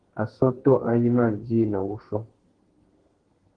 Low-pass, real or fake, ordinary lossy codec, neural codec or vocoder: 9.9 kHz; fake; Opus, 16 kbps; codec, 32 kHz, 1.9 kbps, SNAC